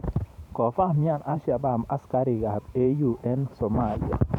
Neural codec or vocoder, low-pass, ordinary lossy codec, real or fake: vocoder, 44.1 kHz, 128 mel bands every 512 samples, BigVGAN v2; 19.8 kHz; none; fake